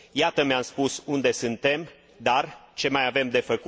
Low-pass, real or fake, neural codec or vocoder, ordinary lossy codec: none; real; none; none